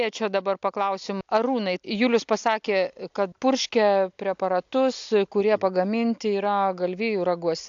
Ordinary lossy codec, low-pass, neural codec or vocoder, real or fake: AAC, 64 kbps; 7.2 kHz; none; real